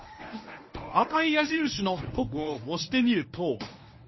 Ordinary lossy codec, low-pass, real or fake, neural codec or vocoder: MP3, 24 kbps; 7.2 kHz; fake; codec, 24 kHz, 0.9 kbps, WavTokenizer, medium speech release version 1